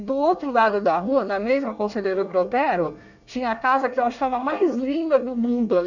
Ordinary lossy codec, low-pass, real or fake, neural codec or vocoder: none; 7.2 kHz; fake; codec, 24 kHz, 1 kbps, SNAC